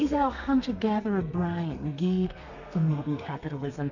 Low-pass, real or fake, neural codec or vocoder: 7.2 kHz; fake; codec, 32 kHz, 1.9 kbps, SNAC